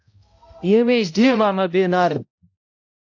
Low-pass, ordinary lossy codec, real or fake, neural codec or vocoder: 7.2 kHz; AAC, 48 kbps; fake; codec, 16 kHz, 0.5 kbps, X-Codec, HuBERT features, trained on balanced general audio